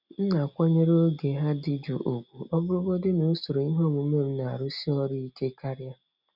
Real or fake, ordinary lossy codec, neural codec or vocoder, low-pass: real; none; none; 5.4 kHz